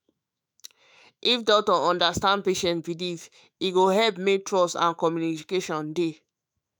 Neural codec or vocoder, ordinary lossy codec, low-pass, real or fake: autoencoder, 48 kHz, 128 numbers a frame, DAC-VAE, trained on Japanese speech; none; none; fake